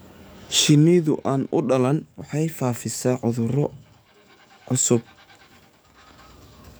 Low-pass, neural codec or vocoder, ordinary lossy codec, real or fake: none; codec, 44.1 kHz, 7.8 kbps, DAC; none; fake